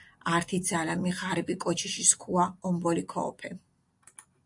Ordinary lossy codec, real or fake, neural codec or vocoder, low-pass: AAC, 64 kbps; real; none; 10.8 kHz